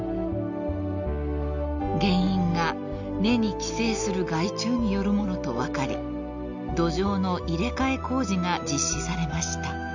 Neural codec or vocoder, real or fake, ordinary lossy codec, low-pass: none; real; none; 7.2 kHz